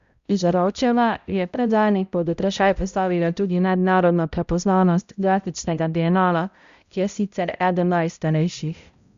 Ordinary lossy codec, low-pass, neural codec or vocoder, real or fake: Opus, 64 kbps; 7.2 kHz; codec, 16 kHz, 0.5 kbps, X-Codec, HuBERT features, trained on balanced general audio; fake